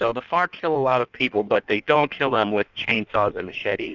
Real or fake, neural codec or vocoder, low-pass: fake; codec, 16 kHz in and 24 kHz out, 1.1 kbps, FireRedTTS-2 codec; 7.2 kHz